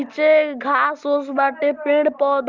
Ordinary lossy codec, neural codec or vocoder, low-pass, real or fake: Opus, 24 kbps; none; 7.2 kHz; real